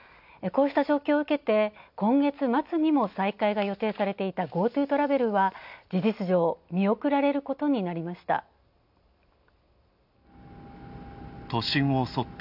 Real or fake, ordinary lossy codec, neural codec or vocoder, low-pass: real; none; none; 5.4 kHz